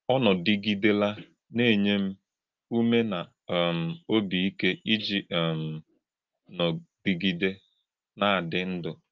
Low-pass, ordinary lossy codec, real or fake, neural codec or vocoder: 7.2 kHz; Opus, 32 kbps; real; none